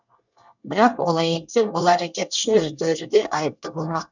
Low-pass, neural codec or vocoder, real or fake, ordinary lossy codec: 7.2 kHz; codec, 24 kHz, 1 kbps, SNAC; fake; none